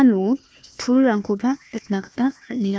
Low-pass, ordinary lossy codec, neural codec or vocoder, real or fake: none; none; codec, 16 kHz, 1 kbps, FunCodec, trained on Chinese and English, 50 frames a second; fake